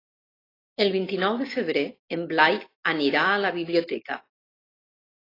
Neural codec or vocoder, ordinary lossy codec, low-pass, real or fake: none; AAC, 24 kbps; 5.4 kHz; real